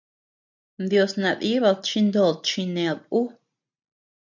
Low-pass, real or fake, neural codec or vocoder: 7.2 kHz; real; none